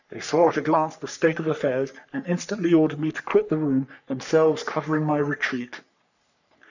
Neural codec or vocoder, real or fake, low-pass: codec, 44.1 kHz, 3.4 kbps, Pupu-Codec; fake; 7.2 kHz